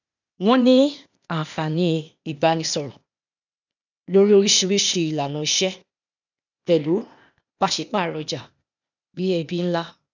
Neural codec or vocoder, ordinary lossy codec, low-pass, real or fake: codec, 16 kHz, 0.8 kbps, ZipCodec; none; 7.2 kHz; fake